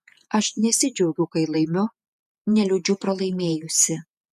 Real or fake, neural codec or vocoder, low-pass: fake; vocoder, 48 kHz, 128 mel bands, Vocos; 14.4 kHz